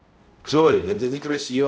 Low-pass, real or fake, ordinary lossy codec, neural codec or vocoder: none; fake; none; codec, 16 kHz, 0.5 kbps, X-Codec, HuBERT features, trained on balanced general audio